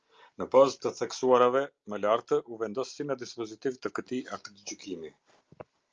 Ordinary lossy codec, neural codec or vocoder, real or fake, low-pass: Opus, 24 kbps; none; real; 7.2 kHz